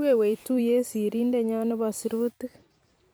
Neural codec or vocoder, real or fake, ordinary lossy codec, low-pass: vocoder, 44.1 kHz, 128 mel bands every 256 samples, BigVGAN v2; fake; none; none